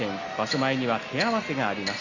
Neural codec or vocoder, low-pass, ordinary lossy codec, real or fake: none; 7.2 kHz; Opus, 64 kbps; real